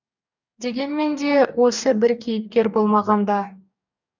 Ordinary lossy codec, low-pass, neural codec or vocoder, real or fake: none; 7.2 kHz; codec, 44.1 kHz, 2.6 kbps, DAC; fake